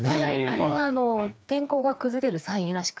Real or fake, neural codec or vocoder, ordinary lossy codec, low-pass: fake; codec, 16 kHz, 2 kbps, FreqCodec, larger model; none; none